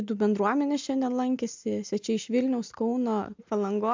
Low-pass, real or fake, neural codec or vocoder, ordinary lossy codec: 7.2 kHz; real; none; MP3, 64 kbps